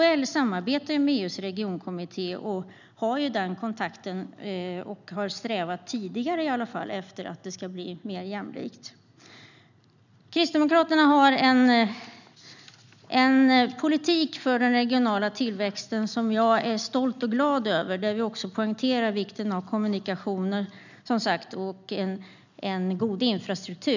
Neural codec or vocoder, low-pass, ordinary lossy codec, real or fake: none; 7.2 kHz; none; real